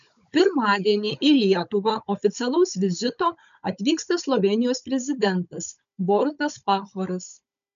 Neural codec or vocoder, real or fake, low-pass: codec, 16 kHz, 16 kbps, FunCodec, trained on Chinese and English, 50 frames a second; fake; 7.2 kHz